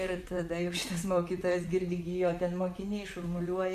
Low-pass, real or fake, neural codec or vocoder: 14.4 kHz; fake; vocoder, 44.1 kHz, 128 mel bands, Pupu-Vocoder